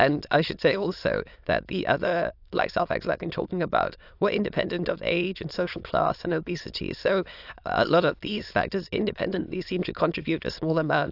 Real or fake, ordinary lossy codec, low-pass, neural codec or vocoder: fake; AAC, 48 kbps; 5.4 kHz; autoencoder, 22.05 kHz, a latent of 192 numbers a frame, VITS, trained on many speakers